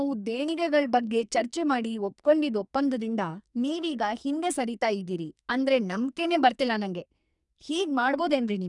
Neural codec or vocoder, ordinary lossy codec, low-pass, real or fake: codec, 44.1 kHz, 2.6 kbps, SNAC; none; 10.8 kHz; fake